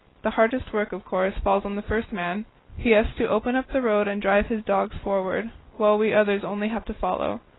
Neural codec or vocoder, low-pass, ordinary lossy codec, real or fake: none; 7.2 kHz; AAC, 16 kbps; real